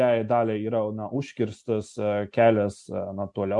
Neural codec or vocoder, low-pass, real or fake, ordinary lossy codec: none; 10.8 kHz; real; MP3, 64 kbps